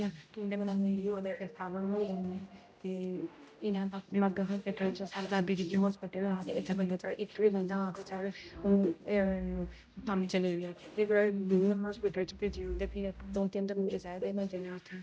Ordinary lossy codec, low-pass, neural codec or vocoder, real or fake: none; none; codec, 16 kHz, 0.5 kbps, X-Codec, HuBERT features, trained on general audio; fake